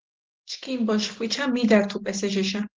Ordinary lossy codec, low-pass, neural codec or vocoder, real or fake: Opus, 16 kbps; 7.2 kHz; none; real